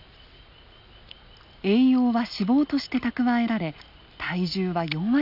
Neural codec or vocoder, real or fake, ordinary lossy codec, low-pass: none; real; none; 5.4 kHz